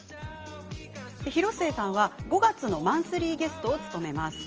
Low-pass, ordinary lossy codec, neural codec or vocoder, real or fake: 7.2 kHz; Opus, 24 kbps; none; real